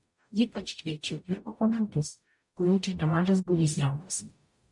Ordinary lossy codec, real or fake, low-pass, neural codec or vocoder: MP3, 48 kbps; fake; 10.8 kHz; codec, 44.1 kHz, 0.9 kbps, DAC